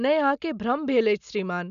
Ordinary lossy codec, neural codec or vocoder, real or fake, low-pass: none; none; real; 7.2 kHz